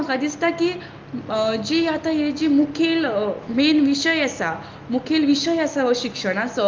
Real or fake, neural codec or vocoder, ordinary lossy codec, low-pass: real; none; Opus, 32 kbps; 7.2 kHz